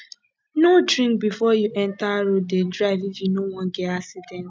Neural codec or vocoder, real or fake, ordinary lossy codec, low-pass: none; real; none; none